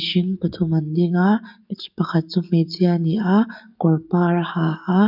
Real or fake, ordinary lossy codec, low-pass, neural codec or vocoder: fake; none; 5.4 kHz; codec, 44.1 kHz, 7.8 kbps, DAC